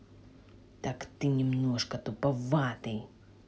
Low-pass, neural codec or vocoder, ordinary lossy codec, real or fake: none; none; none; real